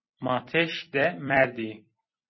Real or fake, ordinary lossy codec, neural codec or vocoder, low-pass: fake; MP3, 24 kbps; vocoder, 44.1 kHz, 128 mel bands every 256 samples, BigVGAN v2; 7.2 kHz